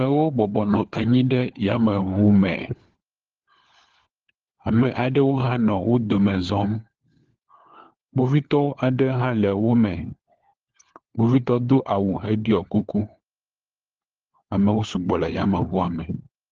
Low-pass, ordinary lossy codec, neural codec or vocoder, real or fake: 7.2 kHz; Opus, 16 kbps; codec, 16 kHz, 4 kbps, FunCodec, trained on LibriTTS, 50 frames a second; fake